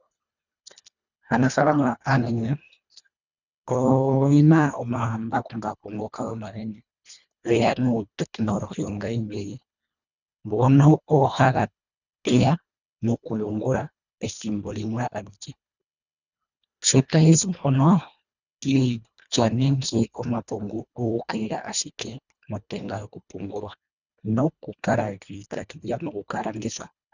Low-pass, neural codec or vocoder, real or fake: 7.2 kHz; codec, 24 kHz, 1.5 kbps, HILCodec; fake